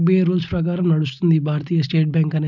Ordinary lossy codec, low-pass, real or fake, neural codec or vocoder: none; 7.2 kHz; real; none